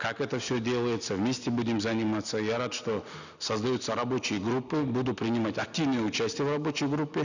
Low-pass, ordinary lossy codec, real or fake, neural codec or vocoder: 7.2 kHz; none; real; none